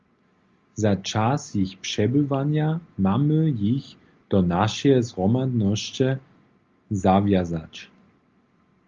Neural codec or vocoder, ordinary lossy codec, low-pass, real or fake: none; Opus, 32 kbps; 7.2 kHz; real